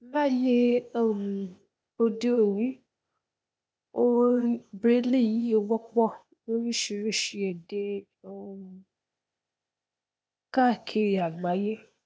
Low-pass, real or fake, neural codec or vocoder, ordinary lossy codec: none; fake; codec, 16 kHz, 0.8 kbps, ZipCodec; none